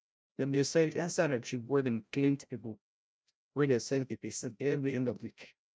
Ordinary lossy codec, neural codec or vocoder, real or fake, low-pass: none; codec, 16 kHz, 0.5 kbps, FreqCodec, larger model; fake; none